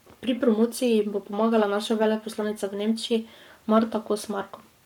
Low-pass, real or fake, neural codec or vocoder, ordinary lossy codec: 19.8 kHz; fake; codec, 44.1 kHz, 7.8 kbps, Pupu-Codec; MP3, 96 kbps